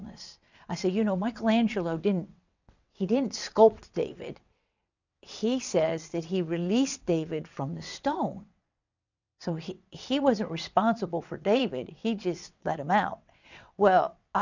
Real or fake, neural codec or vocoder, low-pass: fake; vocoder, 22.05 kHz, 80 mel bands, WaveNeXt; 7.2 kHz